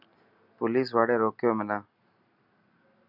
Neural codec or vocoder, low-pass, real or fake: none; 5.4 kHz; real